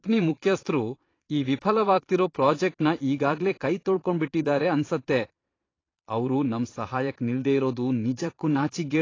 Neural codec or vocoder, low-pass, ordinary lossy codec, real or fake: vocoder, 22.05 kHz, 80 mel bands, Vocos; 7.2 kHz; AAC, 32 kbps; fake